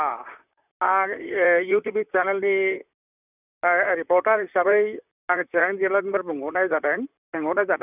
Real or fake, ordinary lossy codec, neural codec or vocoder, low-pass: fake; none; vocoder, 44.1 kHz, 128 mel bands, Pupu-Vocoder; 3.6 kHz